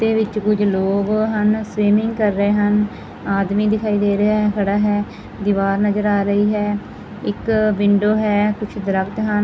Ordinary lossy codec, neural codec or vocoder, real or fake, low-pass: none; none; real; none